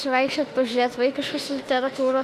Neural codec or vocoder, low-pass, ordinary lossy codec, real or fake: autoencoder, 48 kHz, 32 numbers a frame, DAC-VAE, trained on Japanese speech; 14.4 kHz; AAC, 64 kbps; fake